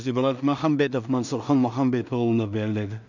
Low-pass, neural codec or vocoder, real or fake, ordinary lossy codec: 7.2 kHz; codec, 16 kHz in and 24 kHz out, 0.4 kbps, LongCat-Audio-Codec, two codebook decoder; fake; none